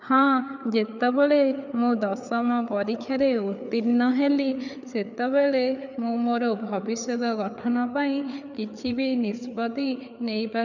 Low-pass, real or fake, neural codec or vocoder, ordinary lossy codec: 7.2 kHz; fake; codec, 16 kHz, 4 kbps, FreqCodec, larger model; none